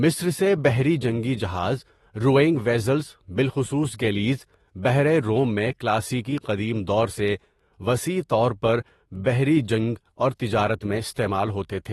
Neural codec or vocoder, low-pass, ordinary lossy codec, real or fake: autoencoder, 48 kHz, 128 numbers a frame, DAC-VAE, trained on Japanese speech; 19.8 kHz; AAC, 32 kbps; fake